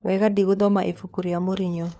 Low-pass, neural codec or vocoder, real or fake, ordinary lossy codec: none; codec, 16 kHz, 4 kbps, FunCodec, trained on LibriTTS, 50 frames a second; fake; none